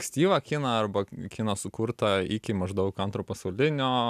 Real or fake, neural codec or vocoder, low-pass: real; none; 14.4 kHz